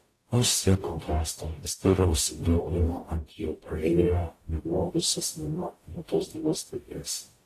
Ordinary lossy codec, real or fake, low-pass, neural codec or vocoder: AAC, 48 kbps; fake; 14.4 kHz; codec, 44.1 kHz, 0.9 kbps, DAC